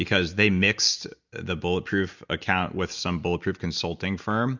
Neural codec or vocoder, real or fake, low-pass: none; real; 7.2 kHz